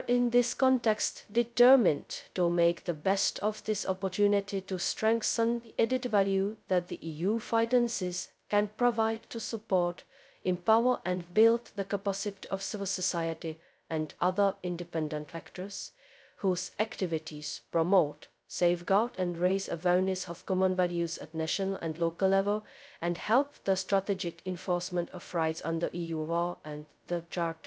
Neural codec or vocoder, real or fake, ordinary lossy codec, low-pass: codec, 16 kHz, 0.2 kbps, FocalCodec; fake; none; none